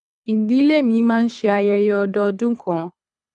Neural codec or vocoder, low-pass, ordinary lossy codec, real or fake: codec, 24 kHz, 3 kbps, HILCodec; 10.8 kHz; none; fake